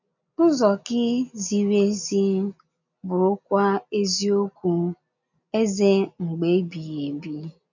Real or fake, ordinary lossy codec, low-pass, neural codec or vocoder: real; none; 7.2 kHz; none